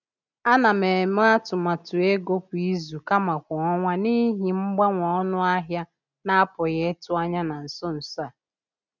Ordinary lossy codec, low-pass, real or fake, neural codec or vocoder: none; 7.2 kHz; real; none